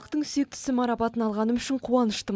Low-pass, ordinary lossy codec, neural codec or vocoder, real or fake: none; none; none; real